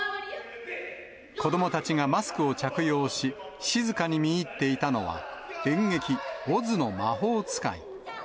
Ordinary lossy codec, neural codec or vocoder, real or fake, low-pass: none; none; real; none